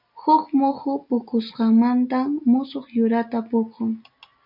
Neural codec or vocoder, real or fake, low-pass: none; real; 5.4 kHz